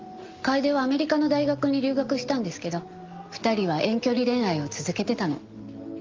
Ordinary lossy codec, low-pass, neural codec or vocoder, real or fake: Opus, 32 kbps; 7.2 kHz; none; real